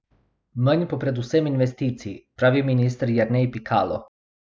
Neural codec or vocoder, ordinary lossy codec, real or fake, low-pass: none; none; real; none